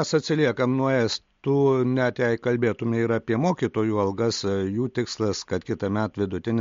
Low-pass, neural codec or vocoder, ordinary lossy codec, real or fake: 7.2 kHz; none; MP3, 48 kbps; real